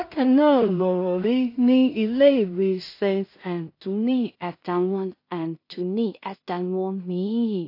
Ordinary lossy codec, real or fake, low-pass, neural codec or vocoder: AAC, 32 kbps; fake; 5.4 kHz; codec, 16 kHz in and 24 kHz out, 0.4 kbps, LongCat-Audio-Codec, two codebook decoder